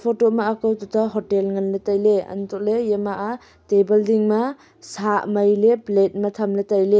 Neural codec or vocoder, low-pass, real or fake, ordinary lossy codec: none; none; real; none